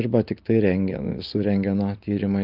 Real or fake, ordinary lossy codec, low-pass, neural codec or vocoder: real; Opus, 24 kbps; 5.4 kHz; none